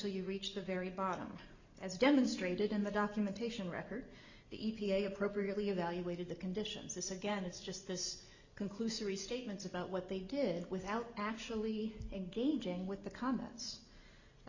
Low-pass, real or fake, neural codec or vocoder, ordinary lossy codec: 7.2 kHz; real; none; Opus, 64 kbps